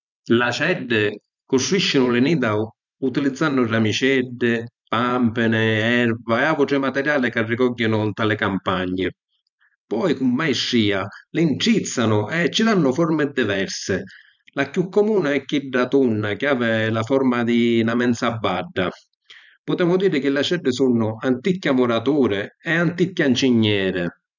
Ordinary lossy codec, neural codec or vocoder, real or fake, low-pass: none; vocoder, 44.1 kHz, 128 mel bands every 512 samples, BigVGAN v2; fake; 7.2 kHz